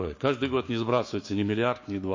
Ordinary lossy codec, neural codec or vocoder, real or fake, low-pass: MP3, 32 kbps; codec, 16 kHz, 6 kbps, DAC; fake; 7.2 kHz